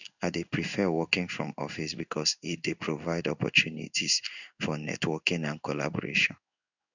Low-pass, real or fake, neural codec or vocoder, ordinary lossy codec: 7.2 kHz; fake; codec, 16 kHz in and 24 kHz out, 1 kbps, XY-Tokenizer; none